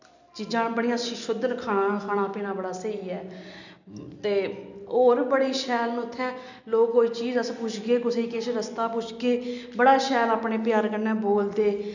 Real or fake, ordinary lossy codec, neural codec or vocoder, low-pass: real; none; none; 7.2 kHz